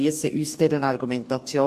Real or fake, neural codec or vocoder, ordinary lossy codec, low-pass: fake; codec, 44.1 kHz, 2.6 kbps, DAC; MP3, 64 kbps; 14.4 kHz